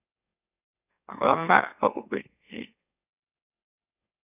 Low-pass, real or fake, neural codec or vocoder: 3.6 kHz; fake; autoencoder, 44.1 kHz, a latent of 192 numbers a frame, MeloTTS